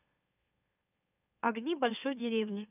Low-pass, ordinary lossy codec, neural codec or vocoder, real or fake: 3.6 kHz; none; autoencoder, 44.1 kHz, a latent of 192 numbers a frame, MeloTTS; fake